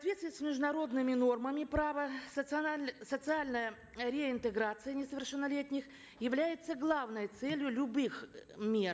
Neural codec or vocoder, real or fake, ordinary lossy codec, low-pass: none; real; none; none